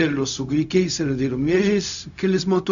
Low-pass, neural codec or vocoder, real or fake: 7.2 kHz; codec, 16 kHz, 0.4 kbps, LongCat-Audio-Codec; fake